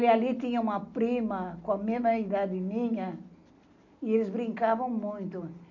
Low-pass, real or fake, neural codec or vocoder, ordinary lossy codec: 7.2 kHz; real; none; none